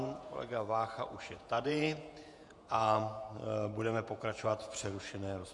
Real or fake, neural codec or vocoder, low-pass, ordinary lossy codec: fake; vocoder, 48 kHz, 128 mel bands, Vocos; 10.8 kHz; MP3, 64 kbps